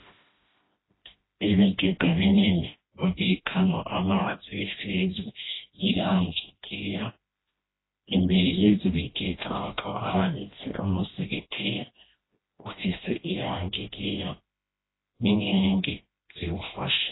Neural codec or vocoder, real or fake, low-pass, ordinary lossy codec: codec, 16 kHz, 1 kbps, FreqCodec, smaller model; fake; 7.2 kHz; AAC, 16 kbps